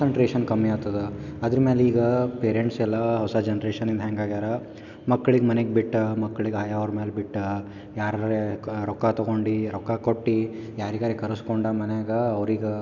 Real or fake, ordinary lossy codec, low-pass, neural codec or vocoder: real; none; 7.2 kHz; none